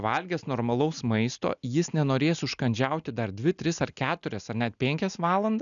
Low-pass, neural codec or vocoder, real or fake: 7.2 kHz; none; real